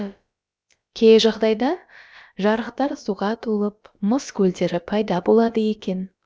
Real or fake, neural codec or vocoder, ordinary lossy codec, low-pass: fake; codec, 16 kHz, about 1 kbps, DyCAST, with the encoder's durations; none; none